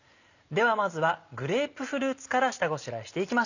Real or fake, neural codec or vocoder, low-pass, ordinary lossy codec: real; none; 7.2 kHz; none